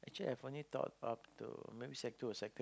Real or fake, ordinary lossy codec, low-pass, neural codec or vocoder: real; none; none; none